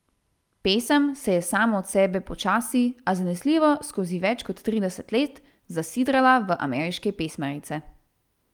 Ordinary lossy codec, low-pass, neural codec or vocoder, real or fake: Opus, 32 kbps; 19.8 kHz; none; real